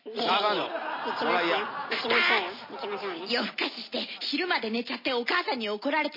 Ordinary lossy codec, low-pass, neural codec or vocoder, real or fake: MP3, 24 kbps; 5.4 kHz; none; real